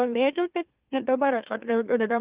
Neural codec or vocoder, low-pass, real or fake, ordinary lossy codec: autoencoder, 44.1 kHz, a latent of 192 numbers a frame, MeloTTS; 3.6 kHz; fake; Opus, 32 kbps